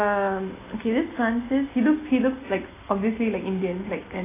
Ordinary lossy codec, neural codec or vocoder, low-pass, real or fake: AAC, 16 kbps; none; 3.6 kHz; real